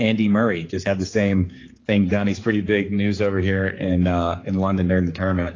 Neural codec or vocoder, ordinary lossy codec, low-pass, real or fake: codec, 16 kHz, 4 kbps, X-Codec, HuBERT features, trained on general audio; AAC, 32 kbps; 7.2 kHz; fake